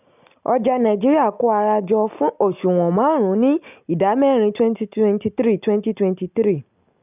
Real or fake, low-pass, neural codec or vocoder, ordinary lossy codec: real; 3.6 kHz; none; none